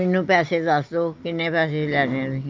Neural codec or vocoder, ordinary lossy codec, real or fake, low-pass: none; Opus, 24 kbps; real; 7.2 kHz